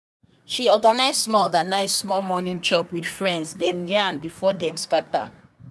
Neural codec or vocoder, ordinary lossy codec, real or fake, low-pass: codec, 24 kHz, 1 kbps, SNAC; none; fake; none